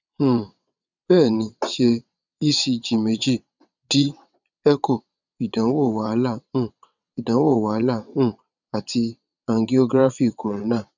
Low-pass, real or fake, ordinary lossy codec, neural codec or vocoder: 7.2 kHz; fake; none; vocoder, 22.05 kHz, 80 mel bands, WaveNeXt